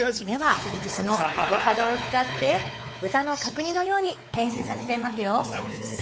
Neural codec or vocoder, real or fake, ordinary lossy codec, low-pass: codec, 16 kHz, 4 kbps, X-Codec, WavLM features, trained on Multilingual LibriSpeech; fake; none; none